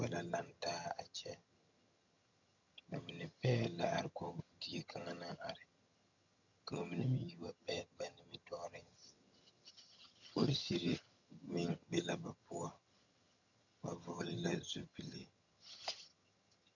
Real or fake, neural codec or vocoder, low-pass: fake; vocoder, 22.05 kHz, 80 mel bands, HiFi-GAN; 7.2 kHz